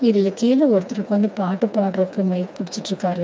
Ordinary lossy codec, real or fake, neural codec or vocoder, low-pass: none; fake; codec, 16 kHz, 2 kbps, FreqCodec, smaller model; none